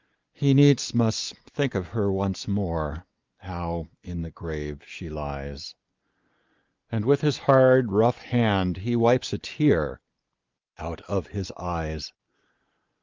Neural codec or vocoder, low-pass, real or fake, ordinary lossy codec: none; 7.2 kHz; real; Opus, 24 kbps